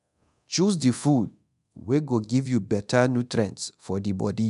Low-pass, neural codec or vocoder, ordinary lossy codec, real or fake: 10.8 kHz; codec, 24 kHz, 0.9 kbps, DualCodec; none; fake